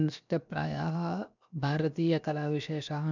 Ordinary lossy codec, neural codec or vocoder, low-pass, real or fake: none; codec, 16 kHz, 0.7 kbps, FocalCodec; 7.2 kHz; fake